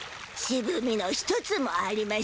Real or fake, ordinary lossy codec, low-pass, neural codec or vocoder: real; none; none; none